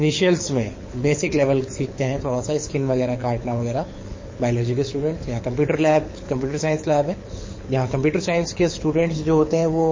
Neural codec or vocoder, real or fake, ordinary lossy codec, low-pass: codec, 24 kHz, 6 kbps, HILCodec; fake; MP3, 32 kbps; 7.2 kHz